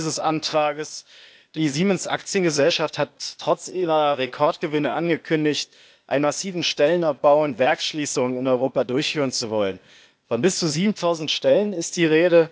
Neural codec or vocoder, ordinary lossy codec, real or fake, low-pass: codec, 16 kHz, 0.8 kbps, ZipCodec; none; fake; none